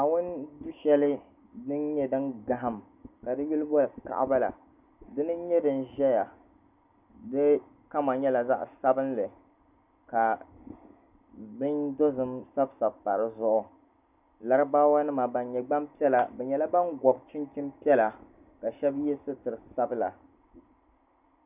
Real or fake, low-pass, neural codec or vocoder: real; 3.6 kHz; none